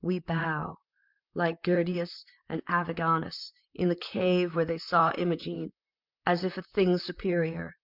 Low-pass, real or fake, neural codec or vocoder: 5.4 kHz; fake; vocoder, 44.1 kHz, 128 mel bands, Pupu-Vocoder